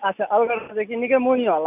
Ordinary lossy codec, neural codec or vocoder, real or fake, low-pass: none; none; real; 3.6 kHz